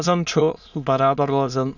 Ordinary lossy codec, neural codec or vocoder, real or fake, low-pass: none; autoencoder, 22.05 kHz, a latent of 192 numbers a frame, VITS, trained on many speakers; fake; 7.2 kHz